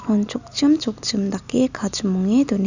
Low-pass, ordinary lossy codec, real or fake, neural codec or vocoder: 7.2 kHz; none; real; none